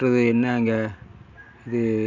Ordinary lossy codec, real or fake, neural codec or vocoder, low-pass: none; real; none; 7.2 kHz